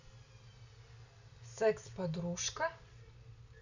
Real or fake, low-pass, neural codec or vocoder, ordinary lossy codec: real; 7.2 kHz; none; none